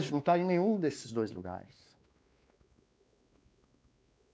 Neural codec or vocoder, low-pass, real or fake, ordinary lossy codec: codec, 16 kHz, 2 kbps, X-Codec, WavLM features, trained on Multilingual LibriSpeech; none; fake; none